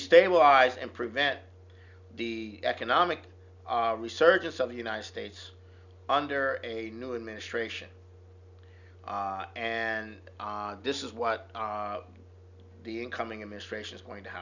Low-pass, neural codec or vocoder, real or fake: 7.2 kHz; none; real